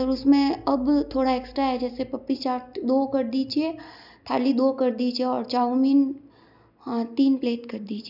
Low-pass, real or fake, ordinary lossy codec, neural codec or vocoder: 5.4 kHz; real; none; none